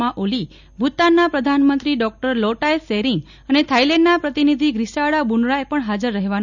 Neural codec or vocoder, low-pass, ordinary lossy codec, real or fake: none; 7.2 kHz; none; real